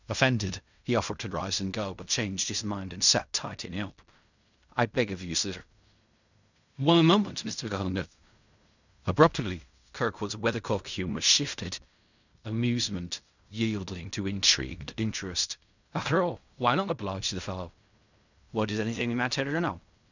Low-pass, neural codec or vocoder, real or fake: 7.2 kHz; codec, 16 kHz in and 24 kHz out, 0.4 kbps, LongCat-Audio-Codec, fine tuned four codebook decoder; fake